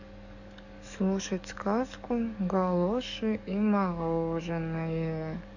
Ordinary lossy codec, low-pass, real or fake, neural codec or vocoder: none; 7.2 kHz; fake; codec, 44.1 kHz, 7.8 kbps, DAC